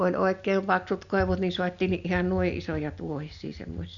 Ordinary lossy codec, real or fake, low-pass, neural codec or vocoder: none; real; 7.2 kHz; none